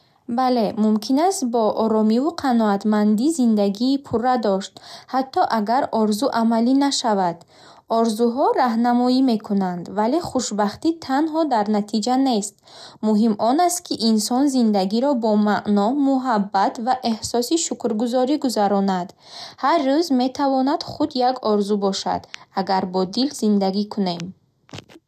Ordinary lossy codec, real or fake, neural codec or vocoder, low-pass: none; real; none; 14.4 kHz